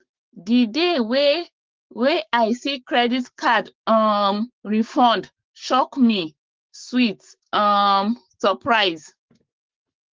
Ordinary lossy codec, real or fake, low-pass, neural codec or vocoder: Opus, 16 kbps; fake; 7.2 kHz; codec, 16 kHz, 6 kbps, DAC